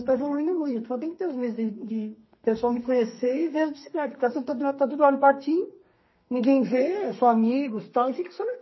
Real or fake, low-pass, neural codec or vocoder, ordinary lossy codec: fake; 7.2 kHz; codec, 32 kHz, 1.9 kbps, SNAC; MP3, 24 kbps